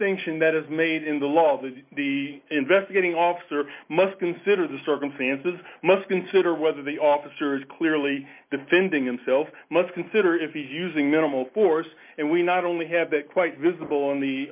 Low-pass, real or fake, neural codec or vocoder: 3.6 kHz; real; none